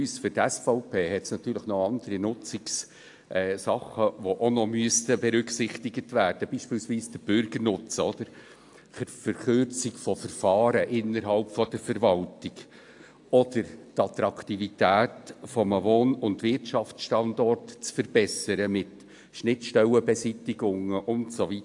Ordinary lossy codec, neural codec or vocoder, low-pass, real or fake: AAC, 64 kbps; none; 10.8 kHz; real